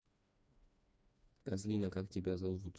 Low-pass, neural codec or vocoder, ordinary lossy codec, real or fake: none; codec, 16 kHz, 2 kbps, FreqCodec, smaller model; none; fake